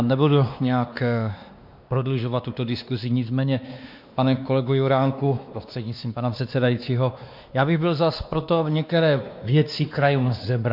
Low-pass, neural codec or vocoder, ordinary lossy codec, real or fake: 5.4 kHz; codec, 16 kHz, 2 kbps, X-Codec, WavLM features, trained on Multilingual LibriSpeech; MP3, 48 kbps; fake